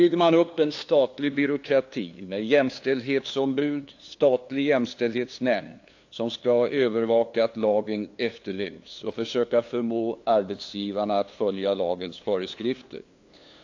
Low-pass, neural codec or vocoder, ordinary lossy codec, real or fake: 7.2 kHz; codec, 16 kHz, 2 kbps, FunCodec, trained on LibriTTS, 25 frames a second; AAC, 48 kbps; fake